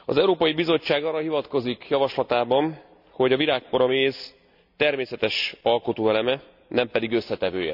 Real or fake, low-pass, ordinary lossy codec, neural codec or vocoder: real; 5.4 kHz; none; none